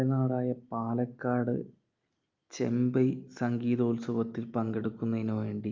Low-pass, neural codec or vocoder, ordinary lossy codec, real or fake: 7.2 kHz; none; Opus, 24 kbps; real